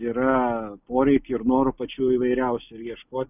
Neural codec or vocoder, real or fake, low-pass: none; real; 3.6 kHz